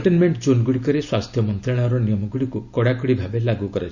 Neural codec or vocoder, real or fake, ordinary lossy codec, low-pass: none; real; none; 7.2 kHz